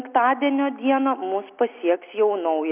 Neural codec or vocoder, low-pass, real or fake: none; 3.6 kHz; real